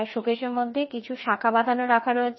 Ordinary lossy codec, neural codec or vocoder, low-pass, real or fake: MP3, 24 kbps; codec, 16 kHz, 2 kbps, FreqCodec, larger model; 7.2 kHz; fake